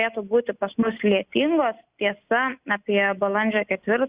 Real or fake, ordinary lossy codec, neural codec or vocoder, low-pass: real; Opus, 64 kbps; none; 3.6 kHz